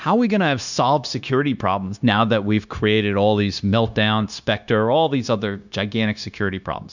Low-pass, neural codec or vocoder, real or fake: 7.2 kHz; codec, 16 kHz, 0.9 kbps, LongCat-Audio-Codec; fake